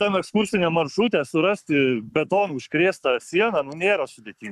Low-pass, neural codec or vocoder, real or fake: 14.4 kHz; codec, 44.1 kHz, 7.8 kbps, Pupu-Codec; fake